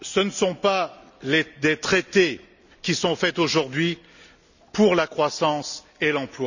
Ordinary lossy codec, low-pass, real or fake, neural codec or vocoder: none; 7.2 kHz; real; none